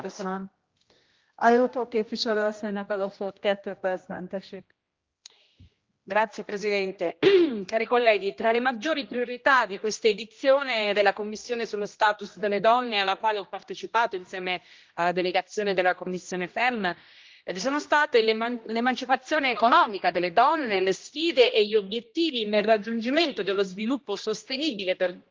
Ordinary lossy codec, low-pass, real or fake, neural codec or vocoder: Opus, 32 kbps; 7.2 kHz; fake; codec, 16 kHz, 1 kbps, X-Codec, HuBERT features, trained on general audio